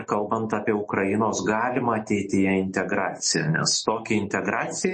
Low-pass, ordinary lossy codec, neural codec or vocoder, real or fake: 10.8 kHz; MP3, 32 kbps; none; real